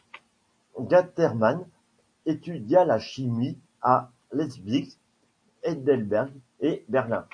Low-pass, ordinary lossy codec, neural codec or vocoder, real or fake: 9.9 kHz; AAC, 48 kbps; none; real